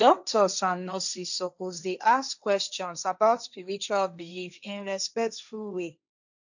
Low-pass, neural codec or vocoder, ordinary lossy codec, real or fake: 7.2 kHz; codec, 16 kHz, 1.1 kbps, Voila-Tokenizer; none; fake